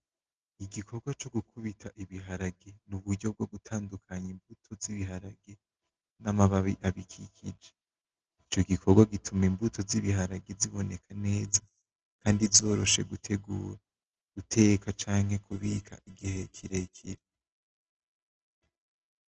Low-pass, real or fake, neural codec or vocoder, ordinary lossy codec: 7.2 kHz; real; none; Opus, 16 kbps